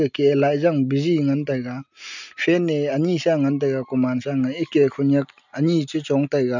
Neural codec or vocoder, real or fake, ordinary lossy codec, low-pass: none; real; none; 7.2 kHz